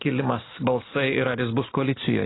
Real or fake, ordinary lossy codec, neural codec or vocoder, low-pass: real; AAC, 16 kbps; none; 7.2 kHz